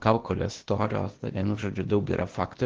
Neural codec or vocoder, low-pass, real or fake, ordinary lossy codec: codec, 16 kHz, 0.8 kbps, ZipCodec; 7.2 kHz; fake; Opus, 16 kbps